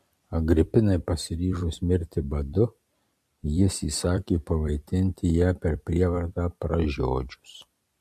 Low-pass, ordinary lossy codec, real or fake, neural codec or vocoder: 14.4 kHz; MP3, 64 kbps; fake; vocoder, 44.1 kHz, 128 mel bands every 256 samples, BigVGAN v2